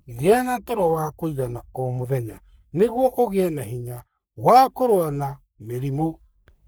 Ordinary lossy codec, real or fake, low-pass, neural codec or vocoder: none; fake; none; codec, 44.1 kHz, 3.4 kbps, Pupu-Codec